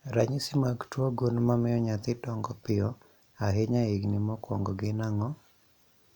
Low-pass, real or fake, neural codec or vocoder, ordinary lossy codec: 19.8 kHz; real; none; none